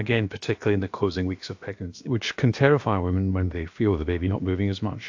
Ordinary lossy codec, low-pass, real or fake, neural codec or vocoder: AAC, 48 kbps; 7.2 kHz; fake; codec, 16 kHz, about 1 kbps, DyCAST, with the encoder's durations